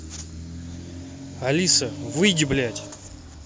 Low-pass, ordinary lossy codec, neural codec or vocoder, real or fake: none; none; none; real